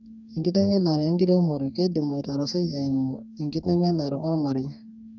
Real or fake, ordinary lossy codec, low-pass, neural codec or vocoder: fake; none; 7.2 kHz; codec, 44.1 kHz, 2.6 kbps, DAC